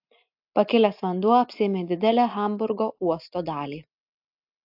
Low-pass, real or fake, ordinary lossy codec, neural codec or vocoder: 5.4 kHz; real; AAC, 48 kbps; none